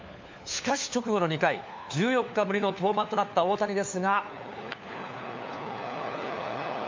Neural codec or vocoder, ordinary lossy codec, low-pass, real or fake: codec, 16 kHz, 4 kbps, FunCodec, trained on LibriTTS, 50 frames a second; MP3, 64 kbps; 7.2 kHz; fake